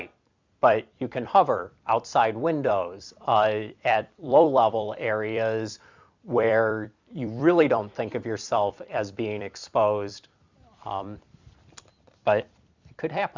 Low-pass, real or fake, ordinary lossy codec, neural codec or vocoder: 7.2 kHz; fake; Opus, 64 kbps; vocoder, 44.1 kHz, 128 mel bands every 256 samples, BigVGAN v2